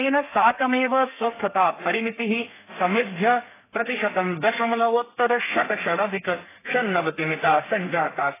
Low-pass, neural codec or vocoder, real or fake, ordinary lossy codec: 3.6 kHz; codec, 32 kHz, 1.9 kbps, SNAC; fake; AAC, 16 kbps